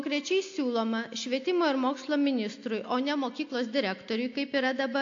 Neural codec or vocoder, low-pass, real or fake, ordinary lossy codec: none; 7.2 kHz; real; AAC, 48 kbps